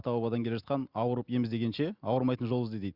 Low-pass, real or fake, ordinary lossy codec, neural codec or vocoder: 5.4 kHz; real; Opus, 64 kbps; none